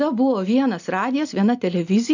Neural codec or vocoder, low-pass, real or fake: none; 7.2 kHz; real